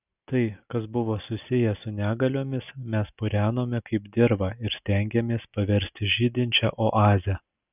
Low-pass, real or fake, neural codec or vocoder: 3.6 kHz; real; none